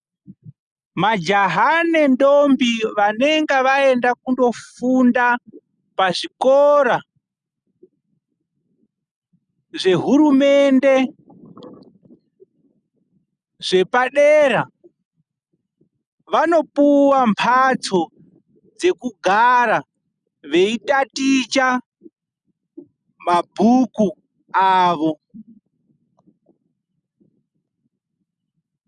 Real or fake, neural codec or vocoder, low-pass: real; none; 9.9 kHz